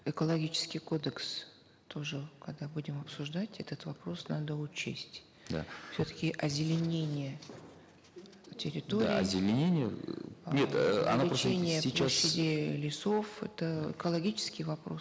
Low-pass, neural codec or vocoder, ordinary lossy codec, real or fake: none; none; none; real